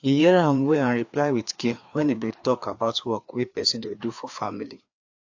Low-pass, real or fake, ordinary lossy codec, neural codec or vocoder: 7.2 kHz; fake; AAC, 48 kbps; codec, 16 kHz, 2 kbps, FreqCodec, larger model